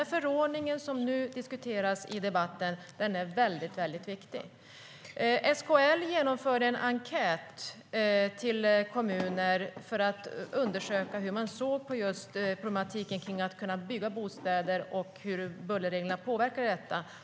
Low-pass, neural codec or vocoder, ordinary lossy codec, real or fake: none; none; none; real